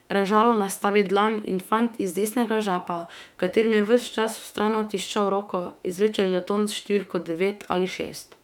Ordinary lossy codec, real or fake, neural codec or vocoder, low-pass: none; fake; autoencoder, 48 kHz, 32 numbers a frame, DAC-VAE, trained on Japanese speech; 19.8 kHz